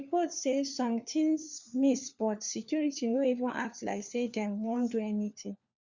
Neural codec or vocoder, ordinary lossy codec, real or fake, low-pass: codec, 16 kHz, 4 kbps, FunCodec, trained on LibriTTS, 50 frames a second; Opus, 64 kbps; fake; 7.2 kHz